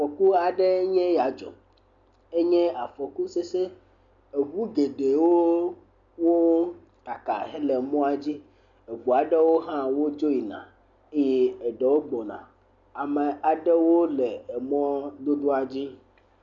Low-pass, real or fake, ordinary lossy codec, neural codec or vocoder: 7.2 kHz; real; MP3, 96 kbps; none